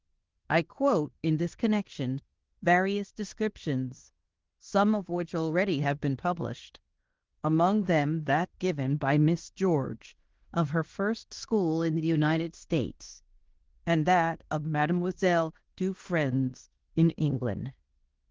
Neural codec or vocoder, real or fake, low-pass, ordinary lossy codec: codec, 16 kHz in and 24 kHz out, 0.9 kbps, LongCat-Audio-Codec, fine tuned four codebook decoder; fake; 7.2 kHz; Opus, 16 kbps